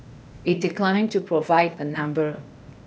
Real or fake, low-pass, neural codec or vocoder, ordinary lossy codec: fake; none; codec, 16 kHz, 0.8 kbps, ZipCodec; none